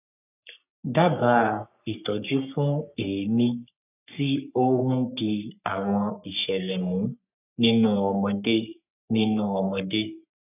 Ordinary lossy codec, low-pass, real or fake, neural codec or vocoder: none; 3.6 kHz; fake; codec, 44.1 kHz, 3.4 kbps, Pupu-Codec